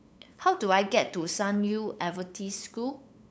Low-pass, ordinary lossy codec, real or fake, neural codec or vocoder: none; none; fake; codec, 16 kHz, 8 kbps, FunCodec, trained on LibriTTS, 25 frames a second